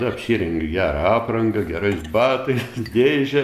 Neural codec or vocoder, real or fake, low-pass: none; real; 14.4 kHz